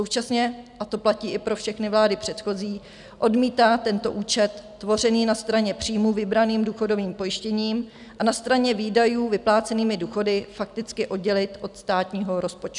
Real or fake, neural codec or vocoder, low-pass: real; none; 10.8 kHz